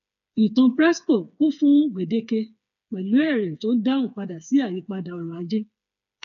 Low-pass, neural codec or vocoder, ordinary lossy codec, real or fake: 7.2 kHz; codec, 16 kHz, 4 kbps, FreqCodec, smaller model; none; fake